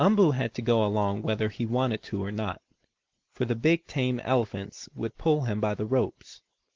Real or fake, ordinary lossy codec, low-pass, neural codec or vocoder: real; Opus, 16 kbps; 7.2 kHz; none